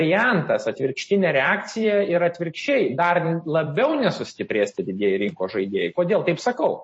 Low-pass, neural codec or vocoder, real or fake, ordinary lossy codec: 9.9 kHz; vocoder, 44.1 kHz, 128 mel bands every 256 samples, BigVGAN v2; fake; MP3, 32 kbps